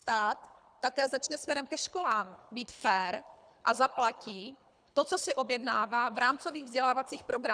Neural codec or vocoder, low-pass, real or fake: codec, 24 kHz, 3 kbps, HILCodec; 9.9 kHz; fake